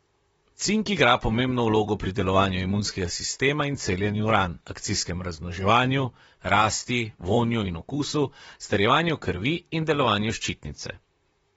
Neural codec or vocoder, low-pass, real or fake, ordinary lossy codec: vocoder, 44.1 kHz, 128 mel bands, Pupu-Vocoder; 19.8 kHz; fake; AAC, 24 kbps